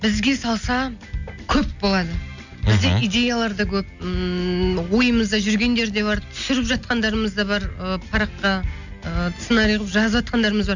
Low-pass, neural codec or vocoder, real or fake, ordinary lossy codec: 7.2 kHz; none; real; none